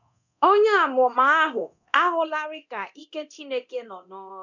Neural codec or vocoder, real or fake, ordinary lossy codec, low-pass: codec, 24 kHz, 0.9 kbps, DualCodec; fake; none; 7.2 kHz